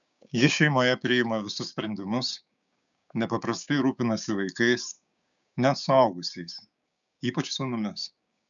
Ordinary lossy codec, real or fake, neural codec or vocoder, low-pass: MP3, 96 kbps; fake; codec, 16 kHz, 6 kbps, DAC; 7.2 kHz